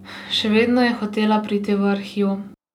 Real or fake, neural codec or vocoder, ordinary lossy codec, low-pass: real; none; none; 19.8 kHz